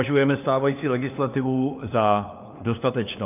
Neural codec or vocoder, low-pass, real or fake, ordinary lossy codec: codec, 16 kHz, 4 kbps, FunCodec, trained on LibriTTS, 50 frames a second; 3.6 kHz; fake; AAC, 32 kbps